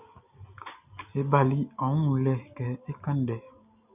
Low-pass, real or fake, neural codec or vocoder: 3.6 kHz; real; none